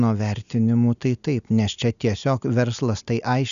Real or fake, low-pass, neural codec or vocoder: real; 7.2 kHz; none